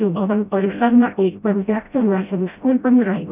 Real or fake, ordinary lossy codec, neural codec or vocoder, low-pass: fake; none; codec, 16 kHz, 0.5 kbps, FreqCodec, smaller model; 3.6 kHz